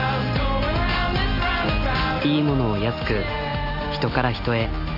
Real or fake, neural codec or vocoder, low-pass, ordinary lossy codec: real; none; 5.4 kHz; AAC, 48 kbps